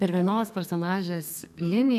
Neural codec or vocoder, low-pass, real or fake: codec, 32 kHz, 1.9 kbps, SNAC; 14.4 kHz; fake